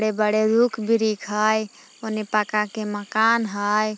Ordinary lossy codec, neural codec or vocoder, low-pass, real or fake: none; none; none; real